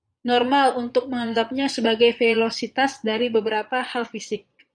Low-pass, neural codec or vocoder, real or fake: 9.9 kHz; vocoder, 22.05 kHz, 80 mel bands, Vocos; fake